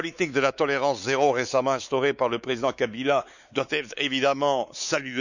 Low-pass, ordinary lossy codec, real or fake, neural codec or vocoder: 7.2 kHz; none; fake; codec, 16 kHz, 4 kbps, X-Codec, WavLM features, trained on Multilingual LibriSpeech